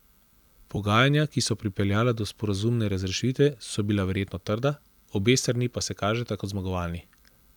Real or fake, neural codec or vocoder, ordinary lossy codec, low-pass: real; none; none; 19.8 kHz